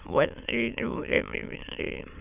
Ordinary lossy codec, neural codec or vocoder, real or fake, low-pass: none; autoencoder, 22.05 kHz, a latent of 192 numbers a frame, VITS, trained on many speakers; fake; 3.6 kHz